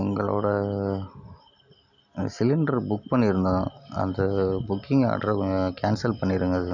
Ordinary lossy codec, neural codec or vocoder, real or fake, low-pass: none; none; real; 7.2 kHz